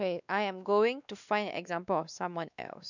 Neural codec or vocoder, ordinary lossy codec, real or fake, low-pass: codec, 16 kHz, 2 kbps, X-Codec, WavLM features, trained on Multilingual LibriSpeech; none; fake; 7.2 kHz